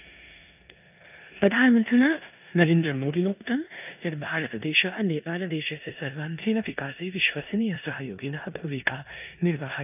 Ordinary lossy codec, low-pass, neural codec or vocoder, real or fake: none; 3.6 kHz; codec, 16 kHz in and 24 kHz out, 0.9 kbps, LongCat-Audio-Codec, four codebook decoder; fake